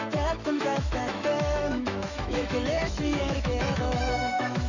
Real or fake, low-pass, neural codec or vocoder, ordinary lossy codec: fake; 7.2 kHz; autoencoder, 48 kHz, 128 numbers a frame, DAC-VAE, trained on Japanese speech; none